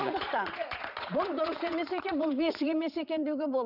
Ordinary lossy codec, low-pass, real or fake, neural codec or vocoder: none; 5.4 kHz; fake; vocoder, 22.05 kHz, 80 mel bands, Vocos